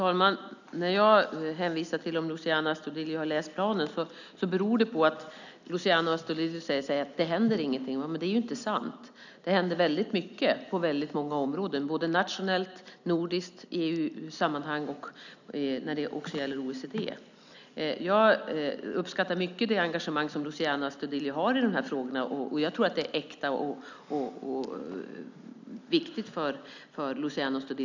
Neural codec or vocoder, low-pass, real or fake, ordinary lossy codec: none; 7.2 kHz; real; none